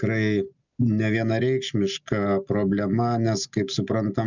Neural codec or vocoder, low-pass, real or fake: none; 7.2 kHz; real